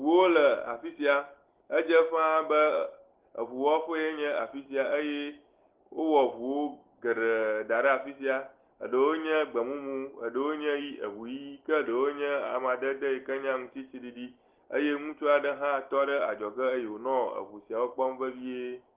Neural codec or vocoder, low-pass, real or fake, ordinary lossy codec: none; 3.6 kHz; real; Opus, 16 kbps